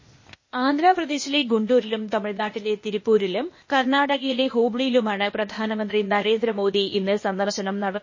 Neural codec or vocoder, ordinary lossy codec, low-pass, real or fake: codec, 16 kHz, 0.8 kbps, ZipCodec; MP3, 32 kbps; 7.2 kHz; fake